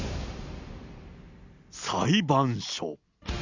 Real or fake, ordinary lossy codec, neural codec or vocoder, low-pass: real; Opus, 64 kbps; none; 7.2 kHz